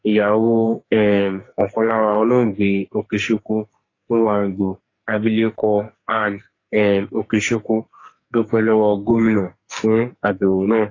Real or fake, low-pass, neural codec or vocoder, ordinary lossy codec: fake; 7.2 kHz; codec, 44.1 kHz, 2.6 kbps, SNAC; AAC, 32 kbps